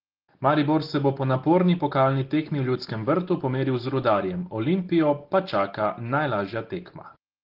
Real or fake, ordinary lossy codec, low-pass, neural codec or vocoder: real; Opus, 16 kbps; 5.4 kHz; none